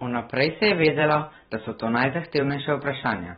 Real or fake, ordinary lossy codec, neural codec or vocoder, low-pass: fake; AAC, 16 kbps; vocoder, 44.1 kHz, 128 mel bands every 512 samples, BigVGAN v2; 19.8 kHz